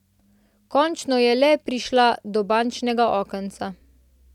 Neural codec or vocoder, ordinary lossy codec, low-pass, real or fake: none; none; 19.8 kHz; real